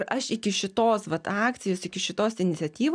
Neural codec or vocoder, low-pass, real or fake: none; 9.9 kHz; real